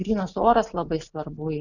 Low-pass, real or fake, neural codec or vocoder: 7.2 kHz; real; none